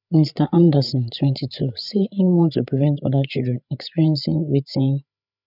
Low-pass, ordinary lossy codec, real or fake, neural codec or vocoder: 5.4 kHz; none; fake; codec, 16 kHz, 8 kbps, FreqCodec, larger model